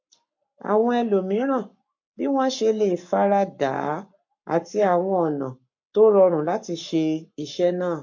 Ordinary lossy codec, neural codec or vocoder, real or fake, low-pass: MP3, 48 kbps; codec, 44.1 kHz, 7.8 kbps, Pupu-Codec; fake; 7.2 kHz